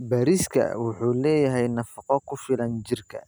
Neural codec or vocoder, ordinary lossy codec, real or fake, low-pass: none; none; real; none